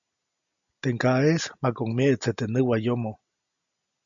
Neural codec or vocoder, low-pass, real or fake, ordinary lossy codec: none; 7.2 kHz; real; MP3, 64 kbps